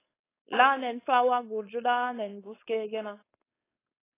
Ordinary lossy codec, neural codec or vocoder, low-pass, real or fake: AAC, 16 kbps; codec, 16 kHz, 4.8 kbps, FACodec; 3.6 kHz; fake